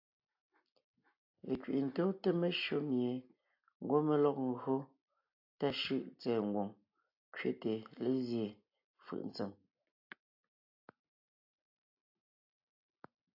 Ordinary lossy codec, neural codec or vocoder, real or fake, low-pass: AAC, 48 kbps; none; real; 5.4 kHz